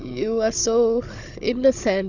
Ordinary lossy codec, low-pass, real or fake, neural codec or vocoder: Opus, 64 kbps; 7.2 kHz; fake; codec, 16 kHz, 16 kbps, FunCodec, trained on Chinese and English, 50 frames a second